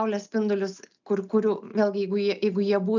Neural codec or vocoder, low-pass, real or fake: none; 7.2 kHz; real